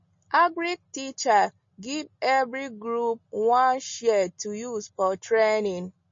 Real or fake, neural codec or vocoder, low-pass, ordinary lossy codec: real; none; 7.2 kHz; MP3, 32 kbps